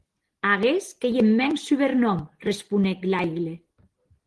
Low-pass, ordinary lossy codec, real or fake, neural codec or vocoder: 10.8 kHz; Opus, 16 kbps; real; none